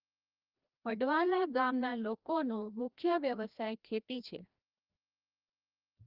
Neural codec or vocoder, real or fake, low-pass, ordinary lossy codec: codec, 16 kHz, 1 kbps, FreqCodec, larger model; fake; 5.4 kHz; Opus, 32 kbps